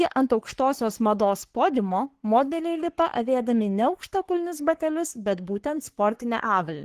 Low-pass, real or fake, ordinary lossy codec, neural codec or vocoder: 14.4 kHz; fake; Opus, 16 kbps; codec, 44.1 kHz, 3.4 kbps, Pupu-Codec